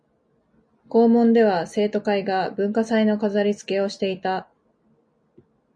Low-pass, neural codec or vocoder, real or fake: 9.9 kHz; none; real